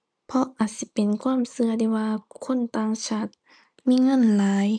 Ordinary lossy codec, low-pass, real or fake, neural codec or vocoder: MP3, 96 kbps; 9.9 kHz; real; none